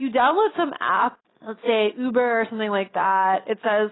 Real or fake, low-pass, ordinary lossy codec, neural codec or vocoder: real; 7.2 kHz; AAC, 16 kbps; none